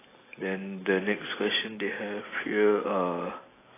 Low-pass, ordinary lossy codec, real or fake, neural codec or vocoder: 3.6 kHz; AAC, 16 kbps; real; none